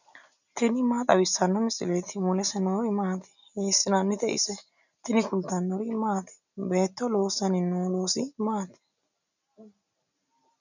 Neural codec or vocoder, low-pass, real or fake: none; 7.2 kHz; real